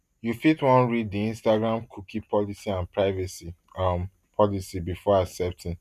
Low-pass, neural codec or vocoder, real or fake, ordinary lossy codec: 14.4 kHz; none; real; none